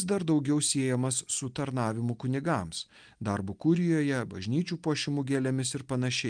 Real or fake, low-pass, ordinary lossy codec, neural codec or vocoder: real; 9.9 kHz; Opus, 32 kbps; none